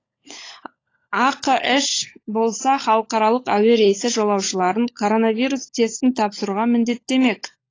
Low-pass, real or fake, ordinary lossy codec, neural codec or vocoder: 7.2 kHz; fake; AAC, 32 kbps; codec, 16 kHz, 16 kbps, FunCodec, trained on LibriTTS, 50 frames a second